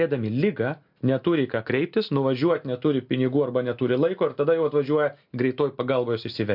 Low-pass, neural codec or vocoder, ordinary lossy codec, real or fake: 5.4 kHz; none; MP3, 48 kbps; real